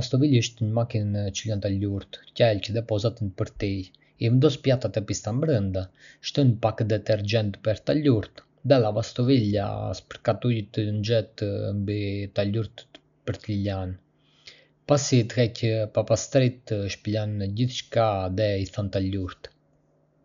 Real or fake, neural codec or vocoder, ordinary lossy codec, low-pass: real; none; none; 7.2 kHz